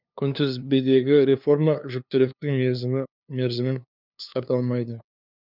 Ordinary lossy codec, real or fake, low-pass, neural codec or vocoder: none; fake; 5.4 kHz; codec, 16 kHz, 2 kbps, FunCodec, trained on LibriTTS, 25 frames a second